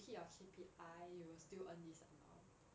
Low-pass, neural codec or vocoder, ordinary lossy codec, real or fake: none; none; none; real